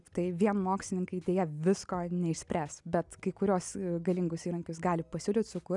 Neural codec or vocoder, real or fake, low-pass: none; real; 10.8 kHz